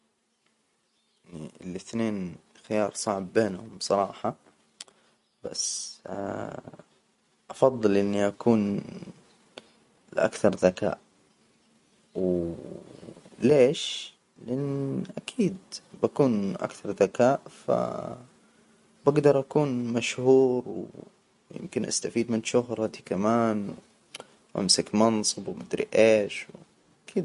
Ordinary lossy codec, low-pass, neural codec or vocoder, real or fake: MP3, 48 kbps; 14.4 kHz; none; real